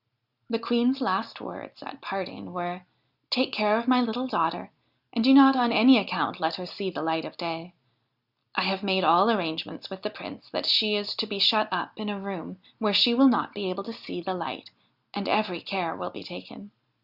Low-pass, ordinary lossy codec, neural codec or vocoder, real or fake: 5.4 kHz; Opus, 64 kbps; none; real